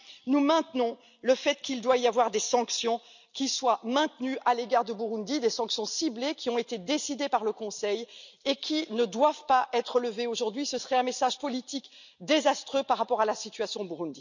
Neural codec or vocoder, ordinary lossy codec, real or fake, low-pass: none; none; real; 7.2 kHz